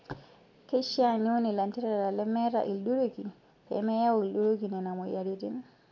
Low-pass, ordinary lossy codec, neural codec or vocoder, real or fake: 7.2 kHz; none; none; real